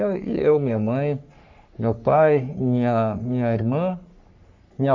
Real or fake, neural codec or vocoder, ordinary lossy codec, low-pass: fake; codec, 44.1 kHz, 3.4 kbps, Pupu-Codec; MP3, 48 kbps; 7.2 kHz